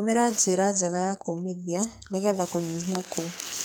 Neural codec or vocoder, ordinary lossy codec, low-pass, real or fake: codec, 44.1 kHz, 2.6 kbps, SNAC; none; none; fake